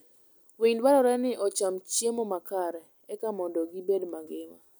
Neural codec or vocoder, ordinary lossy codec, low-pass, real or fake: none; none; none; real